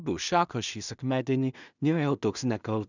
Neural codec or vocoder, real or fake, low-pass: codec, 16 kHz in and 24 kHz out, 0.4 kbps, LongCat-Audio-Codec, two codebook decoder; fake; 7.2 kHz